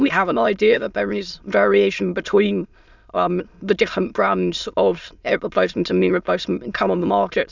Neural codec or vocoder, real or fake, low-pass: autoencoder, 22.05 kHz, a latent of 192 numbers a frame, VITS, trained on many speakers; fake; 7.2 kHz